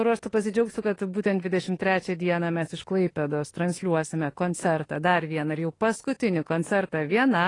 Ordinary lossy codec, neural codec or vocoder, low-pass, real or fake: AAC, 32 kbps; autoencoder, 48 kHz, 32 numbers a frame, DAC-VAE, trained on Japanese speech; 10.8 kHz; fake